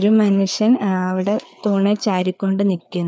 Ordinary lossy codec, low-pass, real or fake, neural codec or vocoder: none; none; fake; codec, 16 kHz, 4 kbps, FreqCodec, larger model